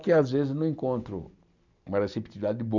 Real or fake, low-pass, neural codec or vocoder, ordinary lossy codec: real; 7.2 kHz; none; none